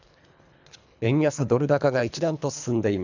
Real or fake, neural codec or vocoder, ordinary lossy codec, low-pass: fake; codec, 24 kHz, 3 kbps, HILCodec; none; 7.2 kHz